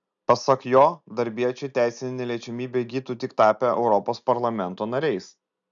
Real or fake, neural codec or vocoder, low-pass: real; none; 7.2 kHz